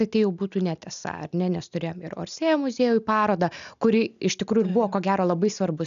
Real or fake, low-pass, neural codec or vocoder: real; 7.2 kHz; none